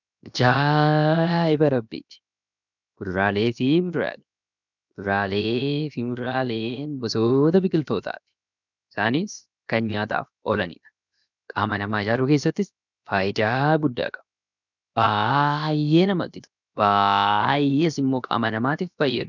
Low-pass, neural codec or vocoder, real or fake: 7.2 kHz; codec, 16 kHz, 0.7 kbps, FocalCodec; fake